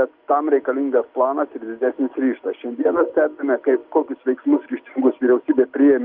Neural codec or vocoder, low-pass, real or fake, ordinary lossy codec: none; 5.4 kHz; real; Opus, 24 kbps